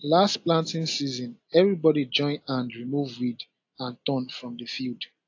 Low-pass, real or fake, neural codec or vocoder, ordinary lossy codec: 7.2 kHz; real; none; AAC, 48 kbps